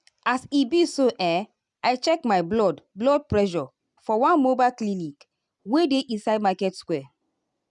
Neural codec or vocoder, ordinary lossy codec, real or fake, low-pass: none; none; real; 10.8 kHz